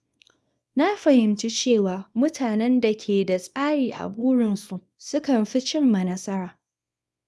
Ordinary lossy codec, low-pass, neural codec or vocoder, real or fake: none; none; codec, 24 kHz, 0.9 kbps, WavTokenizer, small release; fake